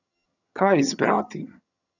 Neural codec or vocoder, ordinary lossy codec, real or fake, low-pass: vocoder, 22.05 kHz, 80 mel bands, HiFi-GAN; none; fake; 7.2 kHz